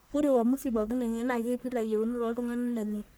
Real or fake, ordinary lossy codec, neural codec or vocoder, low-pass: fake; none; codec, 44.1 kHz, 1.7 kbps, Pupu-Codec; none